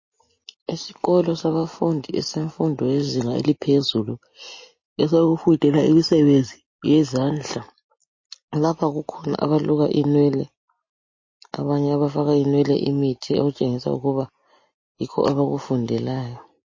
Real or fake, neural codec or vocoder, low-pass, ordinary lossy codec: real; none; 7.2 kHz; MP3, 32 kbps